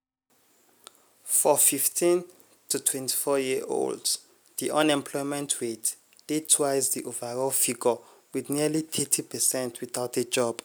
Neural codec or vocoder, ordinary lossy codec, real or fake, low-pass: none; none; real; none